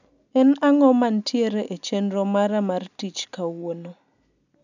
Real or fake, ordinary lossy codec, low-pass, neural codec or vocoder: real; none; 7.2 kHz; none